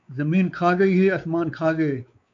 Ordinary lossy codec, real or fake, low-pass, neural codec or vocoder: AAC, 64 kbps; fake; 7.2 kHz; codec, 16 kHz, 4 kbps, X-Codec, WavLM features, trained on Multilingual LibriSpeech